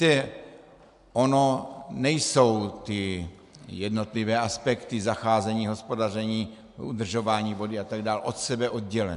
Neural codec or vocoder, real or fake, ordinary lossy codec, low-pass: none; real; AAC, 96 kbps; 10.8 kHz